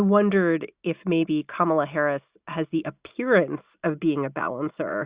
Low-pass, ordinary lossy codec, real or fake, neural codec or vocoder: 3.6 kHz; Opus, 64 kbps; real; none